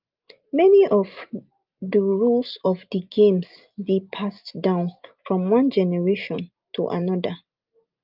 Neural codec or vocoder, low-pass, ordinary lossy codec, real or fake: none; 5.4 kHz; Opus, 24 kbps; real